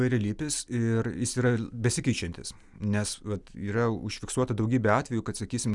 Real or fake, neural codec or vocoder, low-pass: real; none; 10.8 kHz